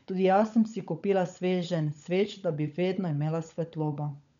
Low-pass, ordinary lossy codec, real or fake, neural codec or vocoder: 7.2 kHz; none; fake; codec, 16 kHz, 16 kbps, FunCodec, trained on LibriTTS, 50 frames a second